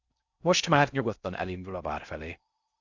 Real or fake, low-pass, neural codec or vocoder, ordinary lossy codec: fake; 7.2 kHz; codec, 16 kHz in and 24 kHz out, 0.6 kbps, FocalCodec, streaming, 4096 codes; Opus, 64 kbps